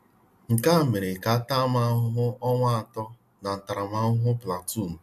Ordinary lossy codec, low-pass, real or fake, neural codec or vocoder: none; 14.4 kHz; real; none